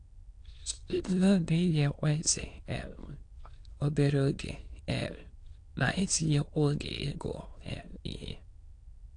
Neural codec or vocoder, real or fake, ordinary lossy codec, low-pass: autoencoder, 22.05 kHz, a latent of 192 numbers a frame, VITS, trained on many speakers; fake; AAC, 48 kbps; 9.9 kHz